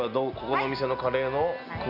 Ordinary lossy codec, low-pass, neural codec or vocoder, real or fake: none; 5.4 kHz; none; real